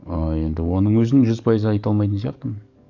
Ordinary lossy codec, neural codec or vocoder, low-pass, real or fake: none; codec, 44.1 kHz, 7.8 kbps, DAC; 7.2 kHz; fake